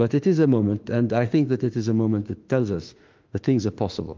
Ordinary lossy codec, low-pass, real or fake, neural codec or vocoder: Opus, 32 kbps; 7.2 kHz; fake; autoencoder, 48 kHz, 32 numbers a frame, DAC-VAE, trained on Japanese speech